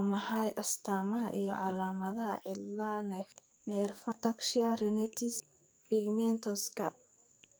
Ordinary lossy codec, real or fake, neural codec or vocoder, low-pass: none; fake; codec, 44.1 kHz, 2.6 kbps, SNAC; none